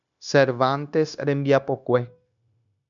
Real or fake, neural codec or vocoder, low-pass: fake; codec, 16 kHz, 0.9 kbps, LongCat-Audio-Codec; 7.2 kHz